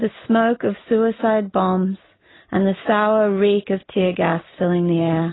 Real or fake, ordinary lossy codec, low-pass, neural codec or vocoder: real; AAC, 16 kbps; 7.2 kHz; none